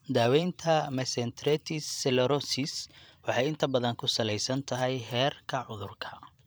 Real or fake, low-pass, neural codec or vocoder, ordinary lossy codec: fake; none; vocoder, 44.1 kHz, 128 mel bands every 512 samples, BigVGAN v2; none